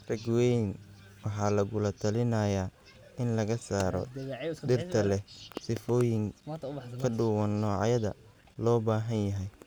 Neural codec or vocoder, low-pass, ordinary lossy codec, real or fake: none; none; none; real